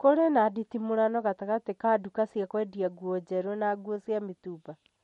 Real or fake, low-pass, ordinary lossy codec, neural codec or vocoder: real; 14.4 kHz; MP3, 48 kbps; none